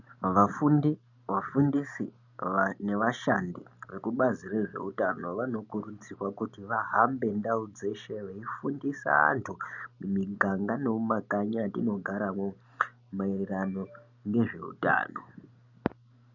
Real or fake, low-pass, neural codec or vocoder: real; 7.2 kHz; none